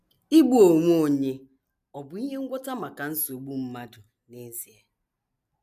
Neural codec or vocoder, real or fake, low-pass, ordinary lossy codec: none; real; 14.4 kHz; none